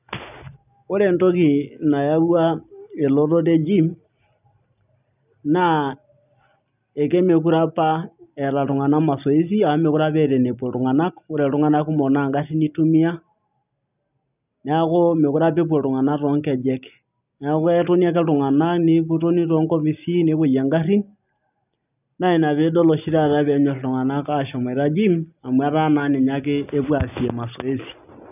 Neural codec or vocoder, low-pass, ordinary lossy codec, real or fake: none; 3.6 kHz; none; real